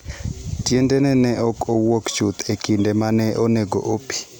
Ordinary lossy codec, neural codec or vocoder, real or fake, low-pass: none; none; real; none